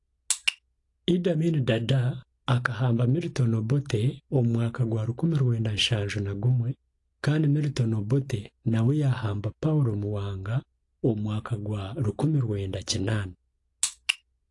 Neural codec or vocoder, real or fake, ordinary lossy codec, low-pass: none; real; AAC, 48 kbps; 10.8 kHz